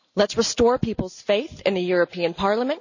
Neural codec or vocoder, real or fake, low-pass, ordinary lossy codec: none; real; 7.2 kHz; none